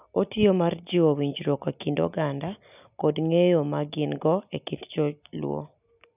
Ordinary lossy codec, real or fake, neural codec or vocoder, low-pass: none; real; none; 3.6 kHz